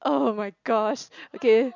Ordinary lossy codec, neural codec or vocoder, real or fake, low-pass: none; none; real; 7.2 kHz